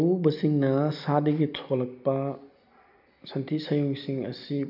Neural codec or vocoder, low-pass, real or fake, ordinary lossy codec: none; 5.4 kHz; real; none